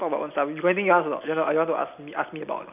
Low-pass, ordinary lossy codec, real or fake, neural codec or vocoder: 3.6 kHz; none; real; none